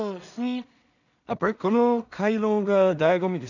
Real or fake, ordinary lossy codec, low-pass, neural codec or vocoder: fake; none; 7.2 kHz; codec, 16 kHz in and 24 kHz out, 0.4 kbps, LongCat-Audio-Codec, two codebook decoder